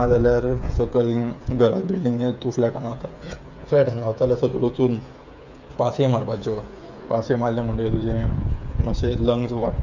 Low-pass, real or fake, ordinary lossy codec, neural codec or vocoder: 7.2 kHz; fake; none; codec, 16 kHz, 8 kbps, FreqCodec, smaller model